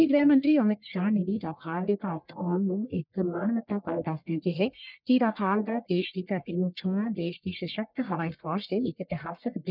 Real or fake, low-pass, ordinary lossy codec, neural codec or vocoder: fake; 5.4 kHz; none; codec, 44.1 kHz, 1.7 kbps, Pupu-Codec